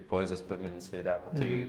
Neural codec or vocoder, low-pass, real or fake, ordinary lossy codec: codec, 44.1 kHz, 2.6 kbps, DAC; 19.8 kHz; fake; Opus, 32 kbps